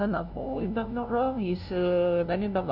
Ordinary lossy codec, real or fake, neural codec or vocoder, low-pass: none; fake; codec, 16 kHz, 0.5 kbps, FunCodec, trained on LibriTTS, 25 frames a second; 5.4 kHz